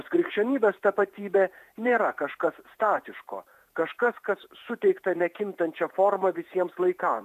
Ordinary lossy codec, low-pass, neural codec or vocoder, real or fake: AAC, 96 kbps; 14.4 kHz; vocoder, 48 kHz, 128 mel bands, Vocos; fake